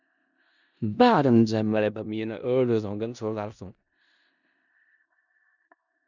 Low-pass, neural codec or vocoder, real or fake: 7.2 kHz; codec, 16 kHz in and 24 kHz out, 0.4 kbps, LongCat-Audio-Codec, four codebook decoder; fake